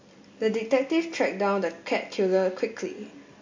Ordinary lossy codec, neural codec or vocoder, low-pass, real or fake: MP3, 48 kbps; none; 7.2 kHz; real